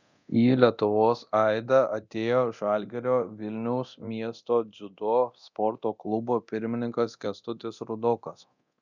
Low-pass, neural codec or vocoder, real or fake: 7.2 kHz; codec, 24 kHz, 0.9 kbps, DualCodec; fake